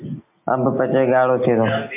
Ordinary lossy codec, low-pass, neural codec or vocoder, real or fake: MP3, 24 kbps; 3.6 kHz; none; real